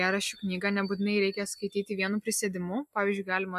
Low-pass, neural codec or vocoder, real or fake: 14.4 kHz; none; real